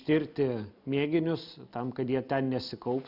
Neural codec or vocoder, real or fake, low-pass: none; real; 5.4 kHz